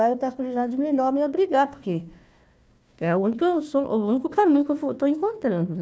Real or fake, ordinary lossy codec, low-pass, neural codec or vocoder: fake; none; none; codec, 16 kHz, 1 kbps, FunCodec, trained on Chinese and English, 50 frames a second